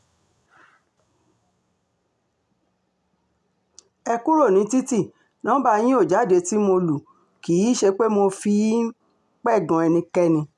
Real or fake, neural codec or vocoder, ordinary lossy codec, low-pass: real; none; none; none